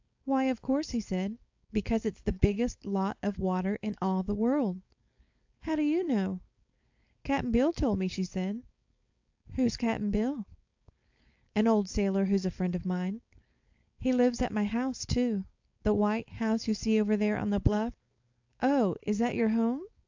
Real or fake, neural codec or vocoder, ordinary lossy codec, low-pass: fake; codec, 16 kHz, 4.8 kbps, FACodec; AAC, 48 kbps; 7.2 kHz